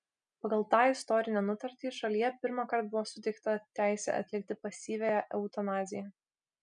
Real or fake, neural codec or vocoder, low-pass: real; none; 9.9 kHz